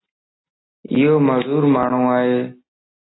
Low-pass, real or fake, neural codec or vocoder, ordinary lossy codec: 7.2 kHz; real; none; AAC, 16 kbps